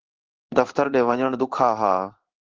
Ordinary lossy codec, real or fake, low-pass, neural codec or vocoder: Opus, 16 kbps; fake; 7.2 kHz; codec, 16 kHz in and 24 kHz out, 1 kbps, XY-Tokenizer